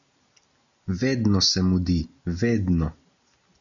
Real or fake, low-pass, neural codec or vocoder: real; 7.2 kHz; none